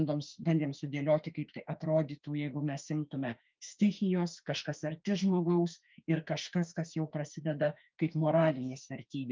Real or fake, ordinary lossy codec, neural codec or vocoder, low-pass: fake; Opus, 24 kbps; autoencoder, 48 kHz, 32 numbers a frame, DAC-VAE, trained on Japanese speech; 7.2 kHz